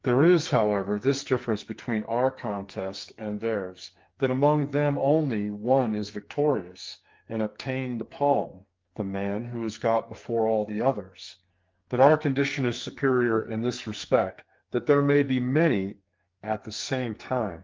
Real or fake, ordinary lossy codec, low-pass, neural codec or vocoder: fake; Opus, 32 kbps; 7.2 kHz; codec, 44.1 kHz, 2.6 kbps, SNAC